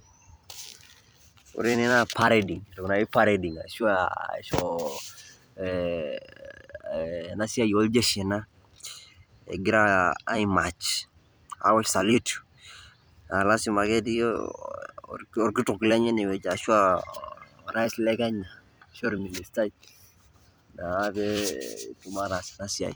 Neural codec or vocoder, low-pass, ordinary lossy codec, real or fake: vocoder, 44.1 kHz, 128 mel bands every 256 samples, BigVGAN v2; none; none; fake